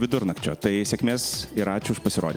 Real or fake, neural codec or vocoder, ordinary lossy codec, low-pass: real; none; Opus, 32 kbps; 19.8 kHz